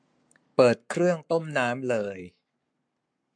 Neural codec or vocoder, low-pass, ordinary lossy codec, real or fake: codec, 44.1 kHz, 7.8 kbps, Pupu-Codec; 9.9 kHz; MP3, 64 kbps; fake